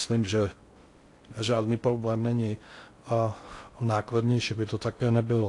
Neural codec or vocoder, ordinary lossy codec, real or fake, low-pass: codec, 16 kHz in and 24 kHz out, 0.6 kbps, FocalCodec, streaming, 4096 codes; AAC, 48 kbps; fake; 10.8 kHz